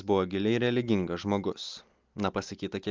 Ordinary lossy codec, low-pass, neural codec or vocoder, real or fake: Opus, 24 kbps; 7.2 kHz; none; real